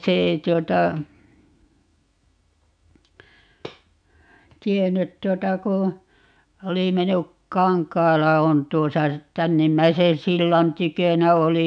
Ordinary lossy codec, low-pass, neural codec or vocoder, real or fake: none; 9.9 kHz; autoencoder, 48 kHz, 128 numbers a frame, DAC-VAE, trained on Japanese speech; fake